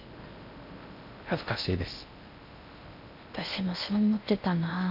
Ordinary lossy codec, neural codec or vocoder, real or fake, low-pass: none; codec, 16 kHz in and 24 kHz out, 0.6 kbps, FocalCodec, streaming, 4096 codes; fake; 5.4 kHz